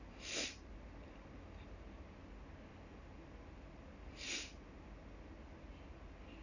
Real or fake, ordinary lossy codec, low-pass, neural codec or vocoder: real; none; 7.2 kHz; none